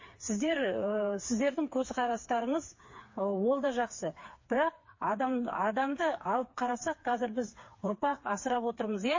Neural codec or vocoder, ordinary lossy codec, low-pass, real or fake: codec, 16 kHz, 4 kbps, FreqCodec, smaller model; MP3, 32 kbps; 7.2 kHz; fake